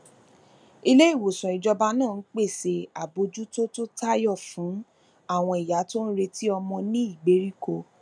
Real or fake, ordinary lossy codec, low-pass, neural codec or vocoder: real; none; 9.9 kHz; none